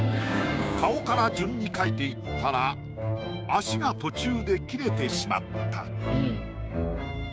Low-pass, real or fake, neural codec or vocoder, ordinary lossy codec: none; fake; codec, 16 kHz, 6 kbps, DAC; none